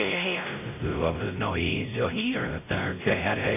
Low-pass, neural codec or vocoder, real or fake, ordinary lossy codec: 3.6 kHz; codec, 16 kHz, 0.5 kbps, X-Codec, WavLM features, trained on Multilingual LibriSpeech; fake; none